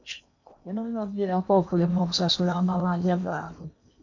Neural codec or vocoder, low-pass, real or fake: codec, 16 kHz in and 24 kHz out, 0.8 kbps, FocalCodec, streaming, 65536 codes; 7.2 kHz; fake